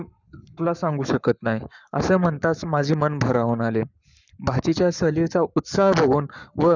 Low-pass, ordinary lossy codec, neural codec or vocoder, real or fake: 7.2 kHz; none; codec, 44.1 kHz, 7.8 kbps, Pupu-Codec; fake